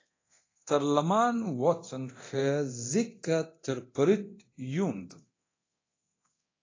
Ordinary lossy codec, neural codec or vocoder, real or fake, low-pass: AAC, 32 kbps; codec, 24 kHz, 0.9 kbps, DualCodec; fake; 7.2 kHz